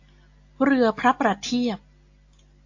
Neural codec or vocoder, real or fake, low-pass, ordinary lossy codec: none; real; 7.2 kHz; AAC, 48 kbps